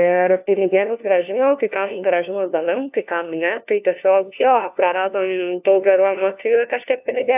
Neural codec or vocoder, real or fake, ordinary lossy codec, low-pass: codec, 16 kHz, 1 kbps, FunCodec, trained on LibriTTS, 50 frames a second; fake; AAC, 32 kbps; 3.6 kHz